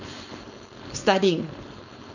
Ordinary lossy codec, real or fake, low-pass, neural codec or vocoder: none; fake; 7.2 kHz; codec, 16 kHz, 4.8 kbps, FACodec